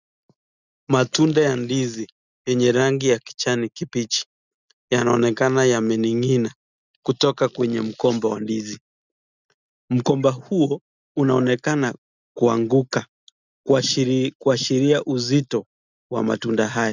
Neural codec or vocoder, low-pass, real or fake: none; 7.2 kHz; real